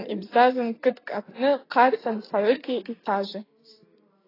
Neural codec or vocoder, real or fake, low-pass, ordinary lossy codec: none; real; 5.4 kHz; AAC, 24 kbps